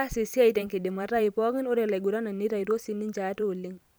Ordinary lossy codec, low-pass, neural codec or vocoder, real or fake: none; none; vocoder, 44.1 kHz, 128 mel bands every 512 samples, BigVGAN v2; fake